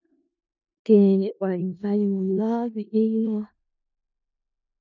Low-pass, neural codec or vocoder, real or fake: 7.2 kHz; codec, 16 kHz in and 24 kHz out, 0.4 kbps, LongCat-Audio-Codec, four codebook decoder; fake